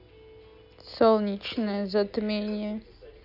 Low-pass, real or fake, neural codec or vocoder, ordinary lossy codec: 5.4 kHz; real; none; none